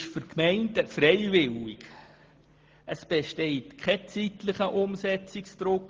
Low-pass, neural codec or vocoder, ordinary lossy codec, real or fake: 7.2 kHz; none; Opus, 16 kbps; real